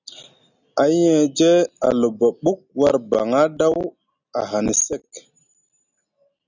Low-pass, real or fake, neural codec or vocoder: 7.2 kHz; real; none